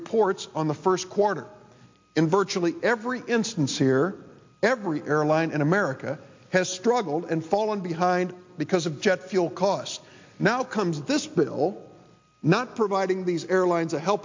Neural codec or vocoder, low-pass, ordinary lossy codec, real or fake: none; 7.2 kHz; MP3, 48 kbps; real